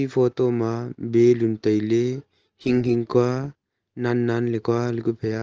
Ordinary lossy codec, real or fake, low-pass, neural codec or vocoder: Opus, 16 kbps; real; 7.2 kHz; none